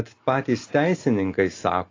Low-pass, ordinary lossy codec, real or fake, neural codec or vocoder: 7.2 kHz; AAC, 32 kbps; real; none